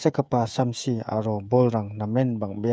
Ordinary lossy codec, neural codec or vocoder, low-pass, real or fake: none; codec, 16 kHz, 16 kbps, FreqCodec, smaller model; none; fake